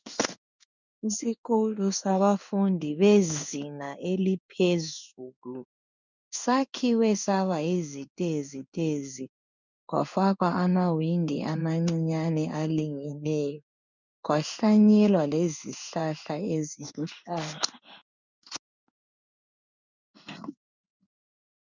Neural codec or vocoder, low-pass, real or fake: codec, 16 kHz in and 24 kHz out, 1 kbps, XY-Tokenizer; 7.2 kHz; fake